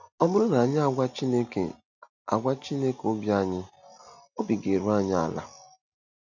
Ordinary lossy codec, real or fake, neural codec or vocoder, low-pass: none; real; none; 7.2 kHz